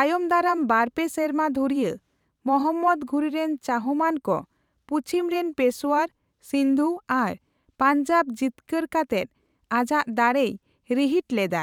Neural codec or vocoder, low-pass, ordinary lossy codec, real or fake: vocoder, 44.1 kHz, 128 mel bands every 512 samples, BigVGAN v2; 19.8 kHz; none; fake